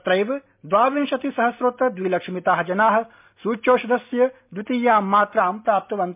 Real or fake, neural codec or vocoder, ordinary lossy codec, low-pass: real; none; MP3, 32 kbps; 3.6 kHz